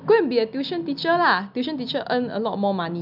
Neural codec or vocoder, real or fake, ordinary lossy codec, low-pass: none; real; none; 5.4 kHz